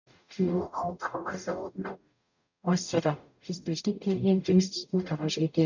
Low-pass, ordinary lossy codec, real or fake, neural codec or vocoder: 7.2 kHz; none; fake; codec, 44.1 kHz, 0.9 kbps, DAC